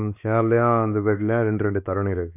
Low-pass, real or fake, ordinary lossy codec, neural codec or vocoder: 3.6 kHz; fake; none; codec, 16 kHz, 2 kbps, X-Codec, WavLM features, trained on Multilingual LibriSpeech